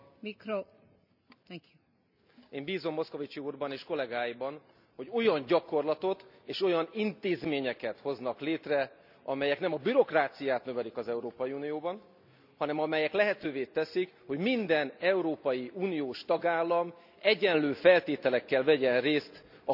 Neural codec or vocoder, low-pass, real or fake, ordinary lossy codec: none; 5.4 kHz; real; none